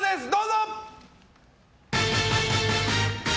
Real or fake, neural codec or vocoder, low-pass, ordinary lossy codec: real; none; none; none